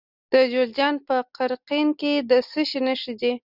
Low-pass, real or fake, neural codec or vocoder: 5.4 kHz; real; none